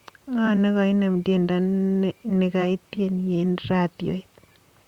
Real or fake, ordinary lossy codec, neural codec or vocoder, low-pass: fake; Opus, 64 kbps; vocoder, 44.1 kHz, 128 mel bands, Pupu-Vocoder; 19.8 kHz